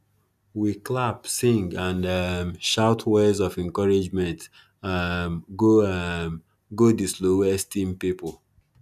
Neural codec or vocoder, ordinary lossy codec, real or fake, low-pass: none; none; real; 14.4 kHz